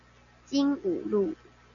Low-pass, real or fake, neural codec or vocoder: 7.2 kHz; real; none